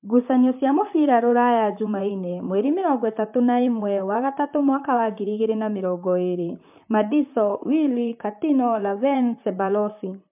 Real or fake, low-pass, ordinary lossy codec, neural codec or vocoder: fake; 3.6 kHz; MP3, 32 kbps; vocoder, 44.1 kHz, 128 mel bands, Pupu-Vocoder